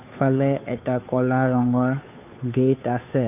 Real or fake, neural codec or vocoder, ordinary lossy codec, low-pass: fake; codec, 16 kHz, 2 kbps, FunCodec, trained on Chinese and English, 25 frames a second; none; 3.6 kHz